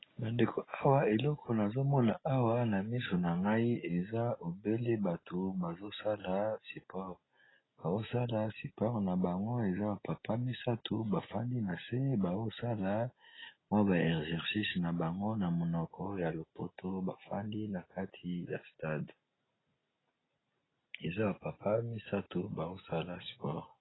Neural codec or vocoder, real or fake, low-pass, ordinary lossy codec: none; real; 7.2 kHz; AAC, 16 kbps